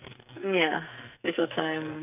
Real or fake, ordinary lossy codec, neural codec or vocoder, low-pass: fake; none; codec, 44.1 kHz, 2.6 kbps, SNAC; 3.6 kHz